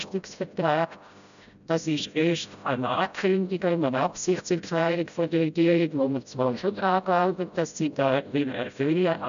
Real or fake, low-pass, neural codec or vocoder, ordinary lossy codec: fake; 7.2 kHz; codec, 16 kHz, 0.5 kbps, FreqCodec, smaller model; none